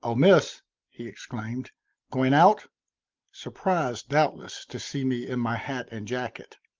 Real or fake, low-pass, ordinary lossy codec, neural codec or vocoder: real; 7.2 kHz; Opus, 32 kbps; none